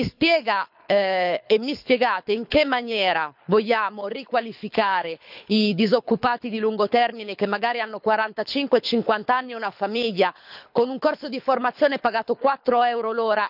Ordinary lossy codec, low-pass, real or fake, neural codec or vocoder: none; 5.4 kHz; fake; codec, 24 kHz, 6 kbps, HILCodec